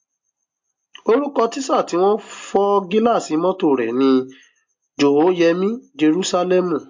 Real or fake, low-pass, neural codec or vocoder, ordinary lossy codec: real; 7.2 kHz; none; MP3, 48 kbps